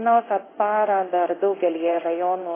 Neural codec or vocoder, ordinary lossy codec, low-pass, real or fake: codec, 16 kHz in and 24 kHz out, 1 kbps, XY-Tokenizer; AAC, 16 kbps; 3.6 kHz; fake